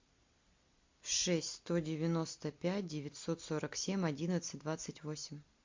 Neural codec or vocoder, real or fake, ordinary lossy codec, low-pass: none; real; MP3, 48 kbps; 7.2 kHz